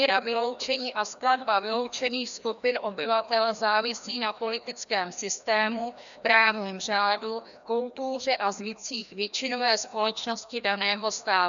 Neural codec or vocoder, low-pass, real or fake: codec, 16 kHz, 1 kbps, FreqCodec, larger model; 7.2 kHz; fake